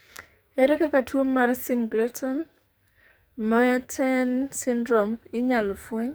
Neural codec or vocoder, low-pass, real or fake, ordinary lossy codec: codec, 44.1 kHz, 2.6 kbps, SNAC; none; fake; none